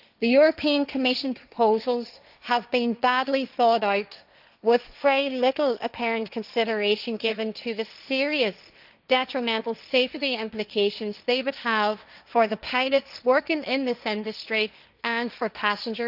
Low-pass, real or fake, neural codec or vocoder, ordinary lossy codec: 5.4 kHz; fake; codec, 16 kHz, 1.1 kbps, Voila-Tokenizer; none